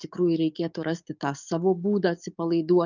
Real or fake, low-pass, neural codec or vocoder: fake; 7.2 kHz; codec, 44.1 kHz, 7.8 kbps, DAC